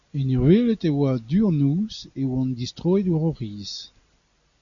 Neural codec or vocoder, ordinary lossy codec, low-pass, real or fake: none; MP3, 96 kbps; 7.2 kHz; real